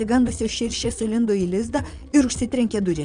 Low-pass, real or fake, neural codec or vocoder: 9.9 kHz; fake; vocoder, 22.05 kHz, 80 mel bands, Vocos